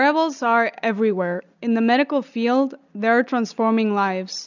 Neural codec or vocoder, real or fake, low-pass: none; real; 7.2 kHz